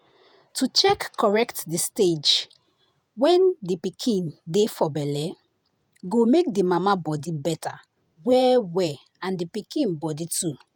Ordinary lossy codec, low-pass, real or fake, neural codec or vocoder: none; none; fake; vocoder, 48 kHz, 128 mel bands, Vocos